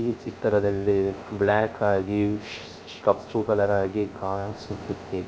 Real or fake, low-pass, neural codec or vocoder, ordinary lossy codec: fake; none; codec, 16 kHz, 0.3 kbps, FocalCodec; none